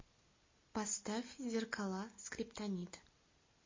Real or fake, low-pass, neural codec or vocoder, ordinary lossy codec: real; 7.2 kHz; none; MP3, 32 kbps